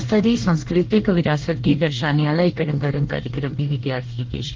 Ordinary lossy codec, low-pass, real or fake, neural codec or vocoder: Opus, 16 kbps; 7.2 kHz; fake; codec, 24 kHz, 1 kbps, SNAC